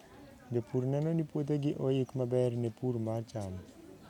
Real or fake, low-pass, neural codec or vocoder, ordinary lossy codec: real; 19.8 kHz; none; none